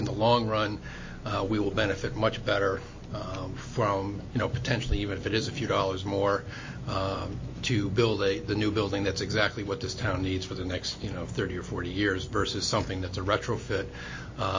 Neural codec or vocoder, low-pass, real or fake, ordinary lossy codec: none; 7.2 kHz; real; MP3, 32 kbps